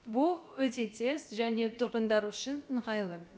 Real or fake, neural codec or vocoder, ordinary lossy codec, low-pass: fake; codec, 16 kHz, about 1 kbps, DyCAST, with the encoder's durations; none; none